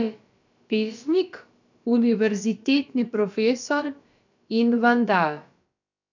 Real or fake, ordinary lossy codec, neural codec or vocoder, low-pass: fake; none; codec, 16 kHz, about 1 kbps, DyCAST, with the encoder's durations; 7.2 kHz